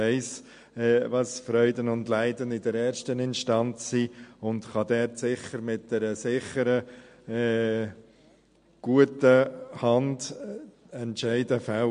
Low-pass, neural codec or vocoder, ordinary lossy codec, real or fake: 9.9 kHz; none; MP3, 48 kbps; real